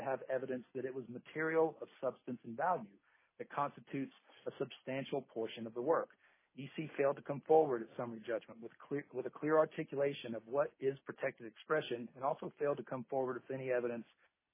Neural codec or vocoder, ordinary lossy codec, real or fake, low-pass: none; MP3, 16 kbps; real; 3.6 kHz